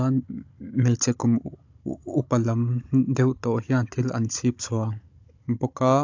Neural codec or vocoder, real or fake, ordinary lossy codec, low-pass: codec, 16 kHz, 16 kbps, FunCodec, trained on Chinese and English, 50 frames a second; fake; AAC, 48 kbps; 7.2 kHz